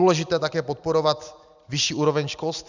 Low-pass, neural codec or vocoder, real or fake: 7.2 kHz; none; real